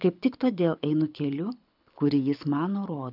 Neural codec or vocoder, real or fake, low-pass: codec, 24 kHz, 6 kbps, HILCodec; fake; 5.4 kHz